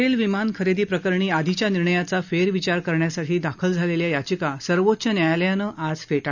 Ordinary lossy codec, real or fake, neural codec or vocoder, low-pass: none; real; none; 7.2 kHz